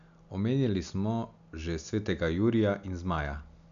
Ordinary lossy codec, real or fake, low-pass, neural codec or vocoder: none; real; 7.2 kHz; none